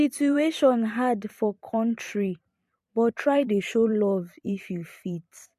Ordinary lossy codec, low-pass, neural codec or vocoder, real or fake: MP3, 64 kbps; 14.4 kHz; vocoder, 44.1 kHz, 128 mel bands every 512 samples, BigVGAN v2; fake